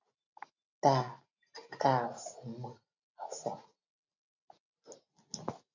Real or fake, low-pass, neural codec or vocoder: real; 7.2 kHz; none